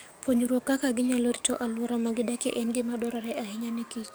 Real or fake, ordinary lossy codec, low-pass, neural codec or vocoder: fake; none; none; codec, 44.1 kHz, 7.8 kbps, DAC